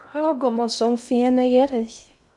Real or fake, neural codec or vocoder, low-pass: fake; codec, 16 kHz in and 24 kHz out, 0.6 kbps, FocalCodec, streaming, 2048 codes; 10.8 kHz